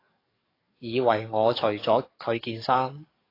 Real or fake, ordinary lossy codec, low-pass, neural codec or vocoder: fake; AAC, 24 kbps; 5.4 kHz; codec, 44.1 kHz, 7.8 kbps, DAC